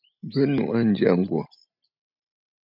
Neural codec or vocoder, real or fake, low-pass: none; real; 5.4 kHz